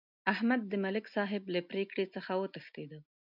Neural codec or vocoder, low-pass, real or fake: none; 5.4 kHz; real